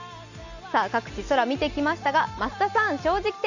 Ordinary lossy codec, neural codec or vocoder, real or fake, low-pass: none; none; real; 7.2 kHz